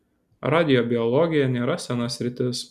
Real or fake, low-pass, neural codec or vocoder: real; 14.4 kHz; none